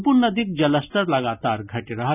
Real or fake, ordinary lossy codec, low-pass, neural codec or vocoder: real; none; 3.6 kHz; none